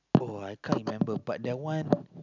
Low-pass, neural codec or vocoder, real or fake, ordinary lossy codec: 7.2 kHz; none; real; none